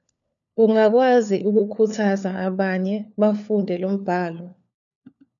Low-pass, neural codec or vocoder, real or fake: 7.2 kHz; codec, 16 kHz, 4 kbps, FunCodec, trained on LibriTTS, 50 frames a second; fake